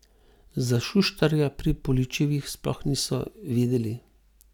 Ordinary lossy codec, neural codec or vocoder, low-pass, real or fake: none; none; 19.8 kHz; real